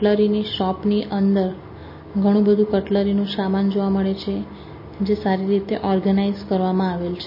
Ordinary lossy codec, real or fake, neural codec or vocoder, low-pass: MP3, 24 kbps; real; none; 5.4 kHz